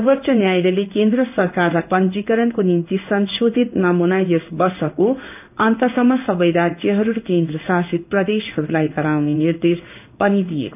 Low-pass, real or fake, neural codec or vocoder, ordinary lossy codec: 3.6 kHz; fake; codec, 16 kHz in and 24 kHz out, 1 kbps, XY-Tokenizer; none